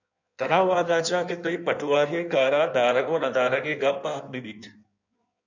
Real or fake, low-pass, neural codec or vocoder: fake; 7.2 kHz; codec, 16 kHz in and 24 kHz out, 1.1 kbps, FireRedTTS-2 codec